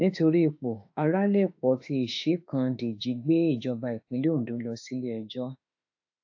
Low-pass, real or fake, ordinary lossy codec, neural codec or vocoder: 7.2 kHz; fake; AAC, 48 kbps; autoencoder, 48 kHz, 32 numbers a frame, DAC-VAE, trained on Japanese speech